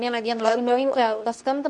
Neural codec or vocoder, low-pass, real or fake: codec, 24 kHz, 0.9 kbps, WavTokenizer, medium speech release version 2; 10.8 kHz; fake